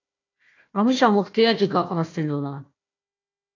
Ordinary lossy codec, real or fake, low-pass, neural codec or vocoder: AAC, 32 kbps; fake; 7.2 kHz; codec, 16 kHz, 1 kbps, FunCodec, trained on Chinese and English, 50 frames a second